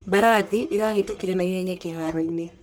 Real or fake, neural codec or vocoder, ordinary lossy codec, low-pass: fake; codec, 44.1 kHz, 1.7 kbps, Pupu-Codec; none; none